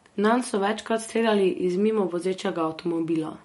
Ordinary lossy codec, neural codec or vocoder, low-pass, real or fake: MP3, 48 kbps; none; 19.8 kHz; real